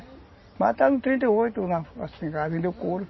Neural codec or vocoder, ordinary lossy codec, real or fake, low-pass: none; MP3, 24 kbps; real; 7.2 kHz